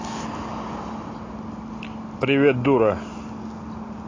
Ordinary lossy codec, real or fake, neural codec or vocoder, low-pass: AAC, 32 kbps; real; none; 7.2 kHz